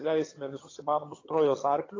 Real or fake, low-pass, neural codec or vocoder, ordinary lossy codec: fake; 7.2 kHz; vocoder, 22.05 kHz, 80 mel bands, HiFi-GAN; AAC, 32 kbps